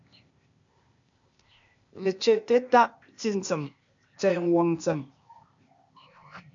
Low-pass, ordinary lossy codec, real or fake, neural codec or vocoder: 7.2 kHz; MP3, 64 kbps; fake; codec, 16 kHz, 0.8 kbps, ZipCodec